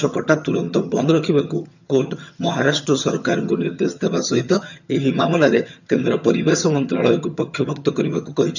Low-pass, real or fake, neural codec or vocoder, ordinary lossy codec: 7.2 kHz; fake; vocoder, 22.05 kHz, 80 mel bands, HiFi-GAN; none